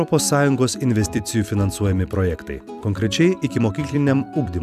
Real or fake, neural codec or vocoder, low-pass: fake; vocoder, 44.1 kHz, 128 mel bands every 256 samples, BigVGAN v2; 14.4 kHz